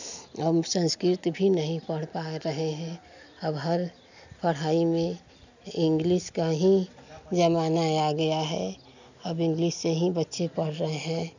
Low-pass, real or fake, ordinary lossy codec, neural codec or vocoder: 7.2 kHz; real; none; none